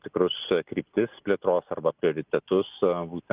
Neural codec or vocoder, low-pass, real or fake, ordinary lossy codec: none; 3.6 kHz; real; Opus, 24 kbps